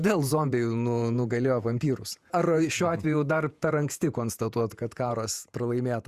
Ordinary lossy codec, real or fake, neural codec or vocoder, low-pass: Opus, 64 kbps; fake; vocoder, 48 kHz, 128 mel bands, Vocos; 14.4 kHz